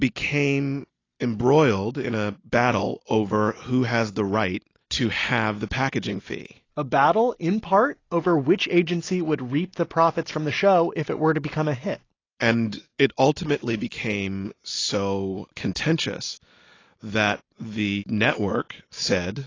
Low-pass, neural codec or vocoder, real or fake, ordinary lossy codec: 7.2 kHz; none; real; AAC, 32 kbps